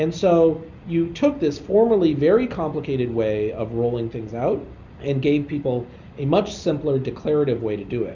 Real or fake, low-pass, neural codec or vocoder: real; 7.2 kHz; none